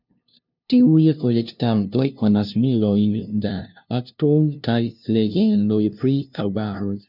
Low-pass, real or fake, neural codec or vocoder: 5.4 kHz; fake; codec, 16 kHz, 0.5 kbps, FunCodec, trained on LibriTTS, 25 frames a second